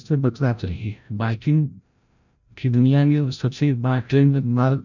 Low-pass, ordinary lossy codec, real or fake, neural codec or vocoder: 7.2 kHz; none; fake; codec, 16 kHz, 0.5 kbps, FreqCodec, larger model